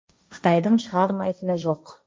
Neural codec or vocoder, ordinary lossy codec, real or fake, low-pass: codec, 16 kHz, 1.1 kbps, Voila-Tokenizer; none; fake; none